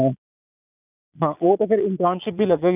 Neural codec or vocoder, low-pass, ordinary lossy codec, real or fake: vocoder, 22.05 kHz, 80 mel bands, Vocos; 3.6 kHz; none; fake